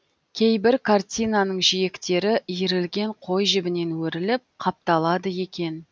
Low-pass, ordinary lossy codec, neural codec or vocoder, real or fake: none; none; none; real